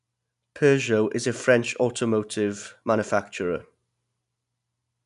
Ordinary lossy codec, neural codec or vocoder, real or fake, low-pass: none; none; real; 10.8 kHz